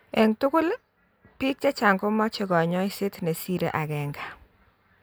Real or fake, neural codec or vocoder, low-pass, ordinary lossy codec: real; none; none; none